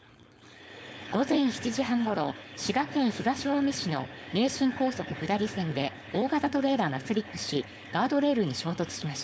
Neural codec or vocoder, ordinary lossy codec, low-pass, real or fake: codec, 16 kHz, 4.8 kbps, FACodec; none; none; fake